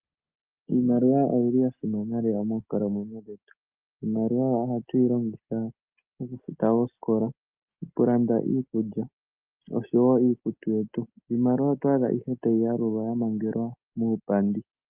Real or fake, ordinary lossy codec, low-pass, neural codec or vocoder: real; Opus, 32 kbps; 3.6 kHz; none